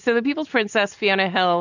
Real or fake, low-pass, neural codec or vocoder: real; 7.2 kHz; none